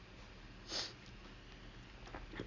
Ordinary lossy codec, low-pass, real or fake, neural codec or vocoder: none; 7.2 kHz; real; none